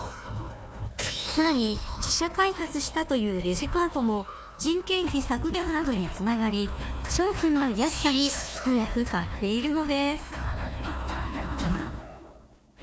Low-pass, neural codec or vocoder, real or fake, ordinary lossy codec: none; codec, 16 kHz, 1 kbps, FunCodec, trained on Chinese and English, 50 frames a second; fake; none